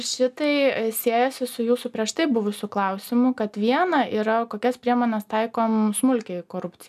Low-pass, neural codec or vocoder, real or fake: 14.4 kHz; none; real